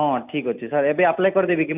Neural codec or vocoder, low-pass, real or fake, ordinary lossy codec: none; 3.6 kHz; real; none